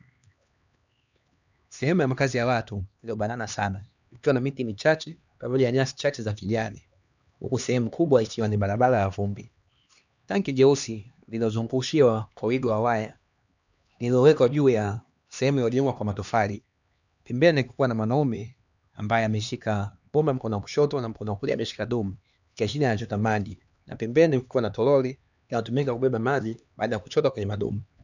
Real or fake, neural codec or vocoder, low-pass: fake; codec, 16 kHz, 2 kbps, X-Codec, HuBERT features, trained on LibriSpeech; 7.2 kHz